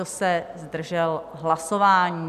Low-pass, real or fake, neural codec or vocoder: 14.4 kHz; real; none